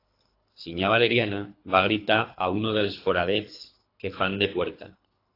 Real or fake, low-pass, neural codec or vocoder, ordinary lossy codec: fake; 5.4 kHz; codec, 24 kHz, 3 kbps, HILCodec; AAC, 32 kbps